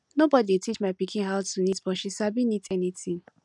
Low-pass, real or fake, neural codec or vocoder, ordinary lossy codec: 10.8 kHz; real; none; none